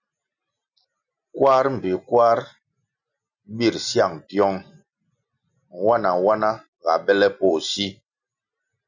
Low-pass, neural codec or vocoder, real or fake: 7.2 kHz; none; real